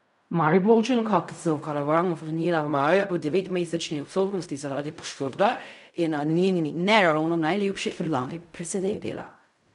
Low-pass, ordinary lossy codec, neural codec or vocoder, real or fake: 10.8 kHz; none; codec, 16 kHz in and 24 kHz out, 0.4 kbps, LongCat-Audio-Codec, fine tuned four codebook decoder; fake